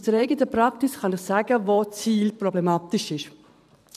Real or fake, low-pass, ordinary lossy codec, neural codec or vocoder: fake; 14.4 kHz; none; vocoder, 44.1 kHz, 128 mel bands every 512 samples, BigVGAN v2